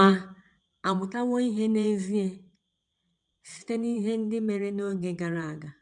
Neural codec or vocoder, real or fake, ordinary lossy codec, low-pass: vocoder, 22.05 kHz, 80 mel bands, WaveNeXt; fake; none; 9.9 kHz